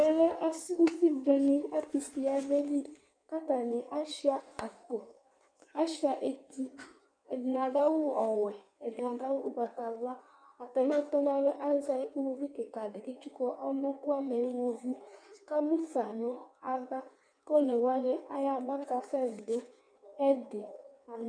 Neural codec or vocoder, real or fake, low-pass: codec, 16 kHz in and 24 kHz out, 1.1 kbps, FireRedTTS-2 codec; fake; 9.9 kHz